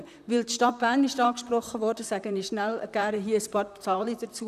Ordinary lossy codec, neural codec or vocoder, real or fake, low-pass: none; vocoder, 44.1 kHz, 128 mel bands, Pupu-Vocoder; fake; 14.4 kHz